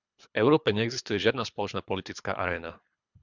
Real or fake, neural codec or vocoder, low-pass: fake; codec, 24 kHz, 3 kbps, HILCodec; 7.2 kHz